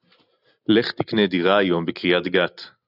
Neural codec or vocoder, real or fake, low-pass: none; real; 5.4 kHz